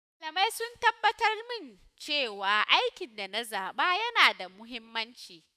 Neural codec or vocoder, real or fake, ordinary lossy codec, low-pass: autoencoder, 48 kHz, 128 numbers a frame, DAC-VAE, trained on Japanese speech; fake; none; 14.4 kHz